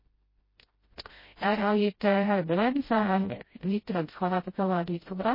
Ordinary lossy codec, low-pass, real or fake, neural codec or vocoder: MP3, 24 kbps; 5.4 kHz; fake; codec, 16 kHz, 0.5 kbps, FreqCodec, smaller model